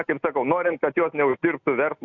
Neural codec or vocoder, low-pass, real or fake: none; 7.2 kHz; real